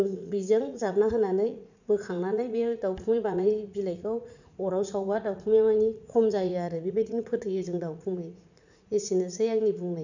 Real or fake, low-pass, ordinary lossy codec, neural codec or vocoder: fake; 7.2 kHz; none; vocoder, 44.1 kHz, 80 mel bands, Vocos